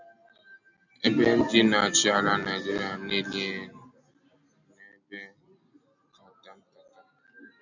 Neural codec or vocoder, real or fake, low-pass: none; real; 7.2 kHz